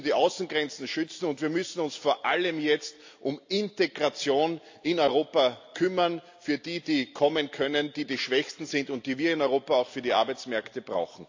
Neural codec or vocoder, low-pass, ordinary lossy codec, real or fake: none; 7.2 kHz; AAC, 48 kbps; real